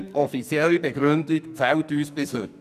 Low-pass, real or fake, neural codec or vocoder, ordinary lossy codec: 14.4 kHz; fake; codec, 44.1 kHz, 2.6 kbps, SNAC; none